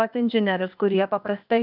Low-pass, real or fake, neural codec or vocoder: 5.4 kHz; fake; codec, 16 kHz, 0.8 kbps, ZipCodec